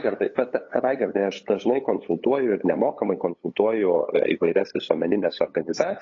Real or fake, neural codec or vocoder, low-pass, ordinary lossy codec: fake; codec, 16 kHz, 8 kbps, FunCodec, trained on LibriTTS, 25 frames a second; 7.2 kHz; AAC, 32 kbps